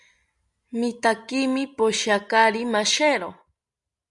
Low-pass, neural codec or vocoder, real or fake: 10.8 kHz; none; real